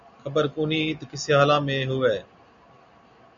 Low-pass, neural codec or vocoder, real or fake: 7.2 kHz; none; real